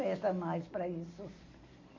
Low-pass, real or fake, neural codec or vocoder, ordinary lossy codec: 7.2 kHz; real; none; MP3, 32 kbps